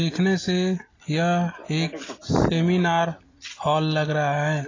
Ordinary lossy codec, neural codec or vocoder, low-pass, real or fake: AAC, 32 kbps; none; 7.2 kHz; real